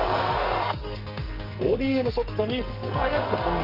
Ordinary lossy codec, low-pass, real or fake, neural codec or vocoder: Opus, 24 kbps; 5.4 kHz; fake; codec, 44.1 kHz, 2.6 kbps, SNAC